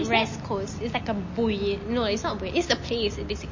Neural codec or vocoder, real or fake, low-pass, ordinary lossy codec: none; real; 7.2 kHz; MP3, 32 kbps